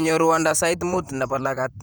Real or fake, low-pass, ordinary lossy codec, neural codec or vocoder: fake; none; none; vocoder, 44.1 kHz, 128 mel bands, Pupu-Vocoder